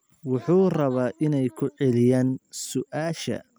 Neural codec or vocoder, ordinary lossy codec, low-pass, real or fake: none; none; none; real